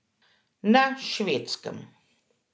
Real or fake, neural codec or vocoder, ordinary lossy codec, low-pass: real; none; none; none